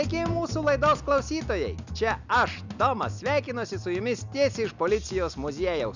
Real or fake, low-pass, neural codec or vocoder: real; 7.2 kHz; none